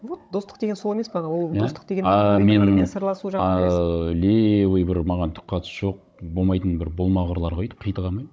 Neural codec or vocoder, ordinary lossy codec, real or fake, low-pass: codec, 16 kHz, 16 kbps, FunCodec, trained on Chinese and English, 50 frames a second; none; fake; none